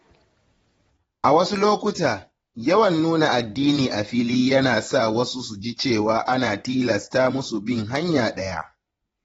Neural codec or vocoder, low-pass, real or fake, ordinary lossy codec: vocoder, 22.05 kHz, 80 mel bands, WaveNeXt; 9.9 kHz; fake; AAC, 24 kbps